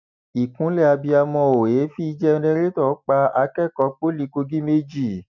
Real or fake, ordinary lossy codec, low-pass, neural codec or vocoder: real; none; 7.2 kHz; none